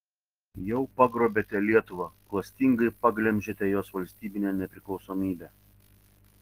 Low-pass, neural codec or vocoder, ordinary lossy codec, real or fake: 14.4 kHz; none; Opus, 16 kbps; real